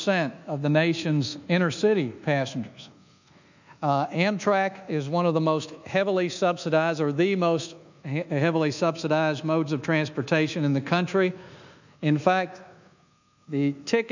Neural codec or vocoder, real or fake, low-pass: codec, 24 kHz, 1.2 kbps, DualCodec; fake; 7.2 kHz